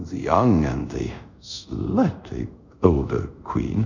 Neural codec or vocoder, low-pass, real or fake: codec, 24 kHz, 0.5 kbps, DualCodec; 7.2 kHz; fake